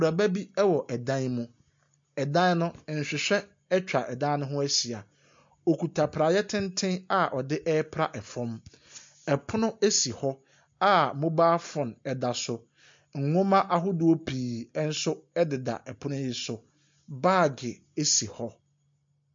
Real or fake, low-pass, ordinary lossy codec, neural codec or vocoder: real; 7.2 kHz; MP3, 48 kbps; none